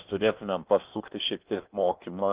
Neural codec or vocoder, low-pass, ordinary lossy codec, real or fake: codec, 16 kHz in and 24 kHz out, 0.8 kbps, FocalCodec, streaming, 65536 codes; 3.6 kHz; Opus, 24 kbps; fake